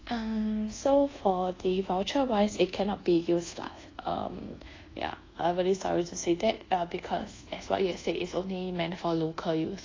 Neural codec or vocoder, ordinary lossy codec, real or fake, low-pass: codec, 24 kHz, 1.2 kbps, DualCodec; AAC, 32 kbps; fake; 7.2 kHz